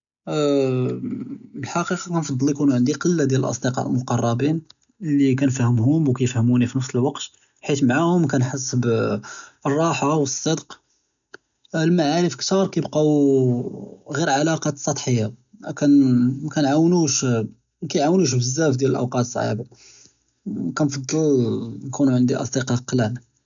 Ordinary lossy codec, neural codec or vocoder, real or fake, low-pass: none; none; real; 7.2 kHz